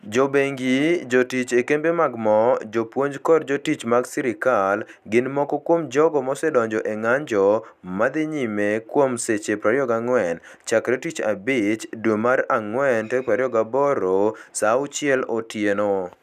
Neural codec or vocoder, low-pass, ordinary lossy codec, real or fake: none; 14.4 kHz; none; real